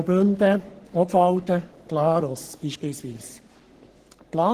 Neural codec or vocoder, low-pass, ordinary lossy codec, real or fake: codec, 44.1 kHz, 3.4 kbps, Pupu-Codec; 14.4 kHz; Opus, 16 kbps; fake